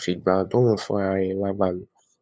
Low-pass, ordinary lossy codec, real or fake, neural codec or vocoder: none; none; fake; codec, 16 kHz, 8 kbps, FunCodec, trained on LibriTTS, 25 frames a second